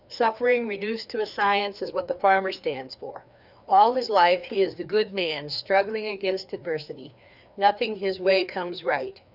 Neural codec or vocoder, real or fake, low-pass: codec, 16 kHz, 2 kbps, FreqCodec, larger model; fake; 5.4 kHz